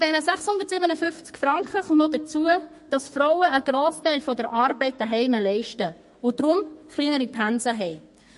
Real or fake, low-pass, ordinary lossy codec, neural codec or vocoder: fake; 14.4 kHz; MP3, 48 kbps; codec, 32 kHz, 1.9 kbps, SNAC